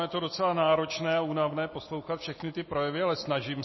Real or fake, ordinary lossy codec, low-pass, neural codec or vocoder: real; MP3, 24 kbps; 7.2 kHz; none